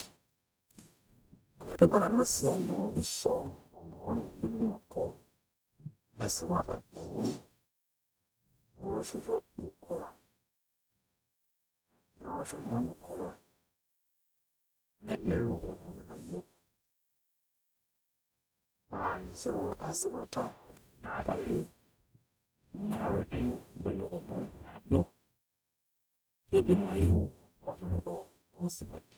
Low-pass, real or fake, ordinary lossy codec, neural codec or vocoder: none; fake; none; codec, 44.1 kHz, 0.9 kbps, DAC